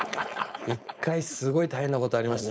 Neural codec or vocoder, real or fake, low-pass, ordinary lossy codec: codec, 16 kHz, 4.8 kbps, FACodec; fake; none; none